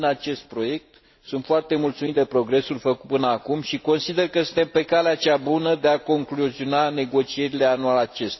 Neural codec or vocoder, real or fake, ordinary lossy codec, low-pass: none; real; MP3, 24 kbps; 7.2 kHz